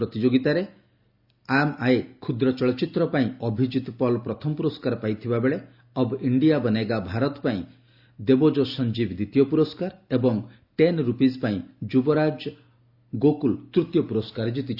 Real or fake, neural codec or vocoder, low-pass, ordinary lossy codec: real; none; 5.4 kHz; Opus, 64 kbps